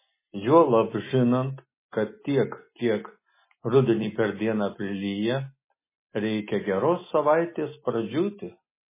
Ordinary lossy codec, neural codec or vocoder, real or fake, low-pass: MP3, 16 kbps; none; real; 3.6 kHz